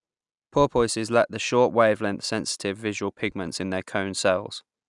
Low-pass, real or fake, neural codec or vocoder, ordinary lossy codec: 10.8 kHz; real; none; none